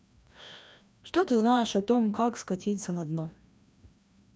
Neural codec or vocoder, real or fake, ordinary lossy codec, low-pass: codec, 16 kHz, 1 kbps, FreqCodec, larger model; fake; none; none